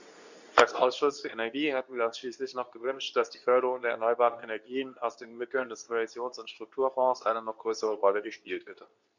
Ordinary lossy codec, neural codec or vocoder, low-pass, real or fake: none; codec, 24 kHz, 0.9 kbps, WavTokenizer, medium speech release version 2; 7.2 kHz; fake